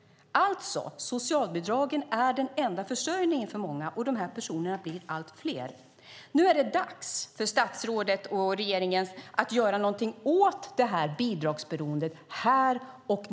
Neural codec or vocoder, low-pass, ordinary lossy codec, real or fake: none; none; none; real